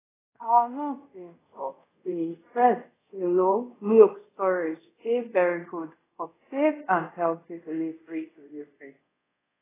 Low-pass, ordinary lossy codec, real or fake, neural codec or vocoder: 3.6 kHz; AAC, 16 kbps; fake; codec, 24 kHz, 0.5 kbps, DualCodec